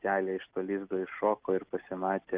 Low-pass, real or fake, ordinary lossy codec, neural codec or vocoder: 3.6 kHz; real; Opus, 32 kbps; none